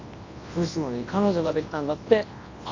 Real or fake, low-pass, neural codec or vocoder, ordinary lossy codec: fake; 7.2 kHz; codec, 24 kHz, 0.9 kbps, WavTokenizer, large speech release; AAC, 32 kbps